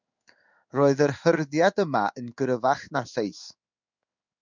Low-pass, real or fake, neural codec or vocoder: 7.2 kHz; fake; codec, 16 kHz in and 24 kHz out, 1 kbps, XY-Tokenizer